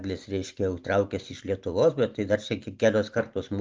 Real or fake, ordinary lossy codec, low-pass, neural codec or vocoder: real; Opus, 24 kbps; 7.2 kHz; none